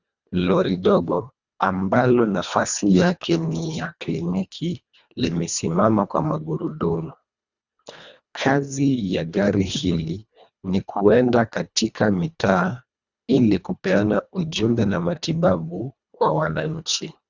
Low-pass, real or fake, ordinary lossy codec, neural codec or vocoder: 7.2 kHz; fake; Opus, 64 kbps; codec, 24 kHz, 1.5 kbps, HILCodec